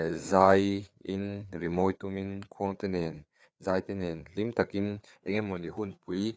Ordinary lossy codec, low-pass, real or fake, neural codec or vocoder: none; none; fake; codec, 16 kHz, 4 kbps, FreqCodec, larger model